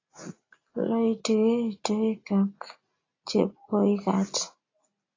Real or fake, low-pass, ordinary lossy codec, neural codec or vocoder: real; 7.2 kHz; AAC, 32 kbps; none